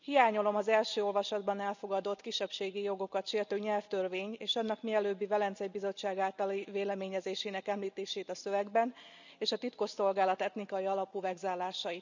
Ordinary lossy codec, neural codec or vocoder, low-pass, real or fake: none; none; 7.2 kHz; real